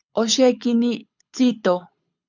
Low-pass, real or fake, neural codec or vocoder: 7.2 kHz; fake; codec, 24 kHz, 6 kbps, HILCodec